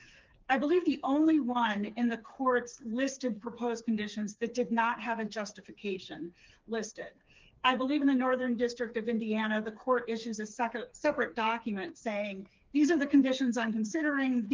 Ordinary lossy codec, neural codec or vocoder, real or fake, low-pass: Opus, 32 kbps; codec, 16 kHz, 4 kbps, FreqCodec, smaller model; fake; 7.2 kHz